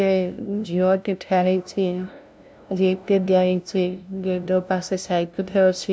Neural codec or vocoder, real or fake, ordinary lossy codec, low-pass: codec, 16 kHz, 0.5 kbps, FunCodec, trained on LibriTTS, 25 frames a second; fake; none; none